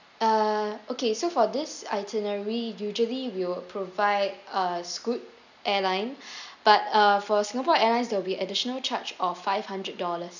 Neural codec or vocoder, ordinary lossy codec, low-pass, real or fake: none; none; 7.2 kHz; real